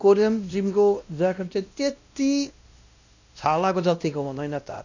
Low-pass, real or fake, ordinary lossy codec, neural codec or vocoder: 7.2 kHz; fake; none; codec, 16 kHz, 1 kbps, X-Codec, WavLM features, trained on Multilingual LibriSpeech